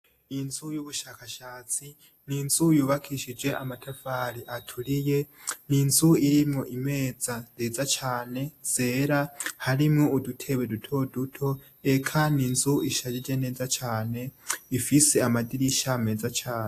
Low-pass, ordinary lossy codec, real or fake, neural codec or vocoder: 14.4 kHz; AAC, 64 kbps; real; none